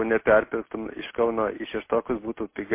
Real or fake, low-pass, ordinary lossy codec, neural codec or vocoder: real; 3.6 kHz; MP3, 24 kbps; none